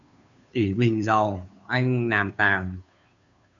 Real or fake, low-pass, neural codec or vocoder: fake; 7.2 kHz; codec, 16 kHz, 2 kbps, FunCodec, trained on Chinese and English, 25 frames a second